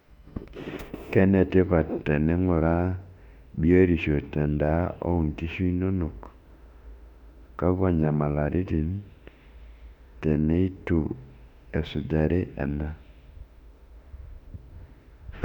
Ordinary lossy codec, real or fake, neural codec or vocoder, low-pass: none; fake; autoencoder, 48 kHz, 32 numbers a frame, DAC-VAE, trained on Japanese speech; 19.8 kHz